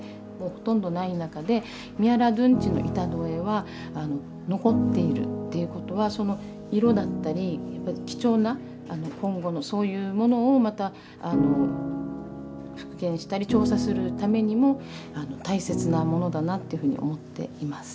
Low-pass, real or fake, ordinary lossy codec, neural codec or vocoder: none; real; none; none